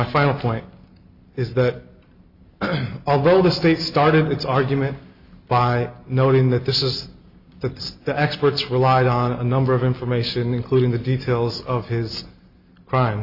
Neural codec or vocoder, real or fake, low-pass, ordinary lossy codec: none; real; 5.4 kHz; Opus, 64 kbps